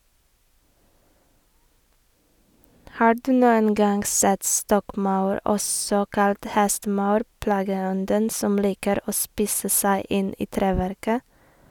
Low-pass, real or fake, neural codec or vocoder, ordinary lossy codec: none; real; none; none